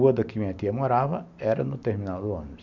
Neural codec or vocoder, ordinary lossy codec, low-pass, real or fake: none; none; 7.2 kHz; real